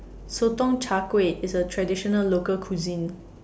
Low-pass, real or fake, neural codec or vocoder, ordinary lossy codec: none; real; none; none